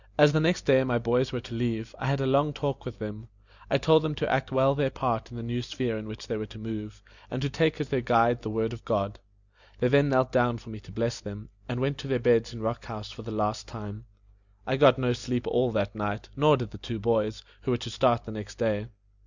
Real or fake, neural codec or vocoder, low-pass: real; none; 7.2 kHz